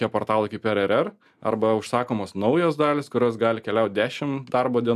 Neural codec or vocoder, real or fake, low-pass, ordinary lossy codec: none; real; 14.4 kHz; MP3, 96 kbps